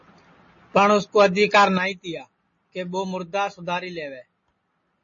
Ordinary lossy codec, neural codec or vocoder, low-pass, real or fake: MP3, 32 kbps; none; 7.2 kHz; real